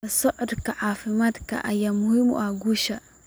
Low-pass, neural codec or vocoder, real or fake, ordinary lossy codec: none; none; real; none